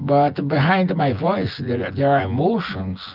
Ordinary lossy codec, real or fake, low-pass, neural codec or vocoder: Opus, 24 kbps; fake; 5.4 kHz; vocoder, 24 kHz, 100 mel bands, Vocos